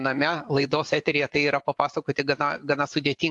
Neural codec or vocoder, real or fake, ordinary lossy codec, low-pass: vocoder, 24 kHz, 100 mel bands, Vocos; fake; AAC, 64 kbps; 10.8 kHz